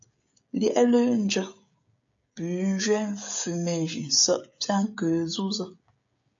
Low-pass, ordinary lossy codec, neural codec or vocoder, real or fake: 7.2 kHz; AAC, 64 kbps; codec, 16 kHz, 16 kbps, FreqCodec, smaller model; fake